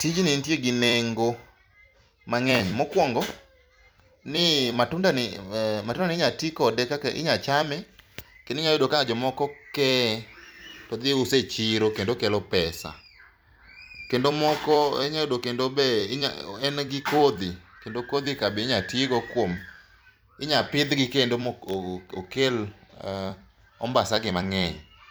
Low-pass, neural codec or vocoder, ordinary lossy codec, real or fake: none; vocoder, 44.1 kHz, 128 mel bands every 256 samples, BigVGAN v2; none; fake